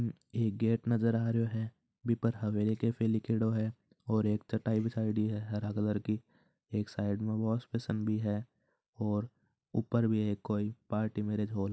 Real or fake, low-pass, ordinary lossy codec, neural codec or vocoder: real; none; none; none